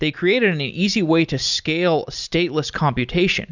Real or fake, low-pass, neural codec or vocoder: real; 7.2 kHz; none